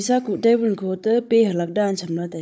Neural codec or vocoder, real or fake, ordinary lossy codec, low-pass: codec, 16 kHz, 16 kbps, FunCodec, trained on Chinese and English, 50 frames a second; fake; none; none